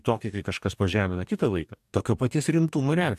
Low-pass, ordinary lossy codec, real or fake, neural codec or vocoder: 14.4 kHz; MP3, 96 kbps; fake; codec, 44.1 kHz, 2.6 kbps, DAC